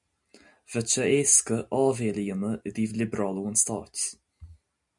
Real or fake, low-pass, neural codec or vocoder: real; 10.8 kHz; none